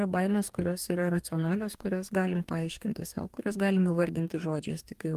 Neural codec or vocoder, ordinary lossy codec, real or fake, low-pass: codec, 44.1 kHz, 2.6 kbps, DAC; Opus, 32 kbps; fake; 14.4 kHz